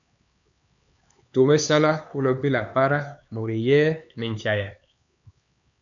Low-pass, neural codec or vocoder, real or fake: 7.2 kHz; codec, 16 kHz, 4 kbps, X-Codec, HuBERT features, trained on LibriSpeech; fake